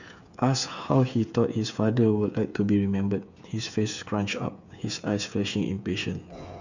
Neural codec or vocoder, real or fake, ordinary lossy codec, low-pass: codec, 16 kHz, 8 kbps, FreqCodec, smaller model; fake; none; 7.2 kHz